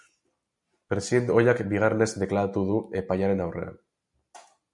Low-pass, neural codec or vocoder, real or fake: 10.8 kHz; none; real